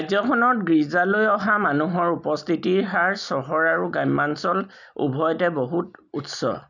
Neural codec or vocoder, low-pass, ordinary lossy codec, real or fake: none; 7.2 kHz; none; real